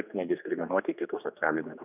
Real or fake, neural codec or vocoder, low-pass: fake; codec, 16 kHz, 2 kbps, X-Codec, HuBERT features, trained on general audio; 3.6 kHz